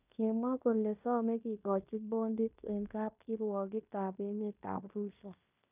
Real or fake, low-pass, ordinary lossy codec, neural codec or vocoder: fake; 3.6 kHz; none; codec, 16 kHz in and 24 kHz out, 0.9 kbps, LongCat-Audio-Codec, fine tuned four codebook decoder